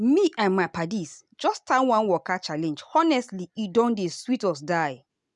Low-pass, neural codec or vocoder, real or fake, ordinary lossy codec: 10.8 kHz; none; real; none